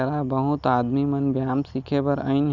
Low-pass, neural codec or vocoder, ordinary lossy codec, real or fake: 7.2 kHz; none; none; real